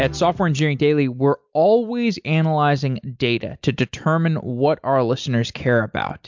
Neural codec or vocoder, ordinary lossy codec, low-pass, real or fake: none; MP3, 64 kbps; 7.2 kHz; real